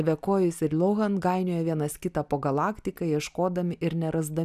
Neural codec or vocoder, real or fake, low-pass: none; real; 14.4 kHz